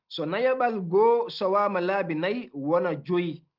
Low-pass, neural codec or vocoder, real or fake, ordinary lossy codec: 5.4 kHz; none; real; Opus, 32 kbps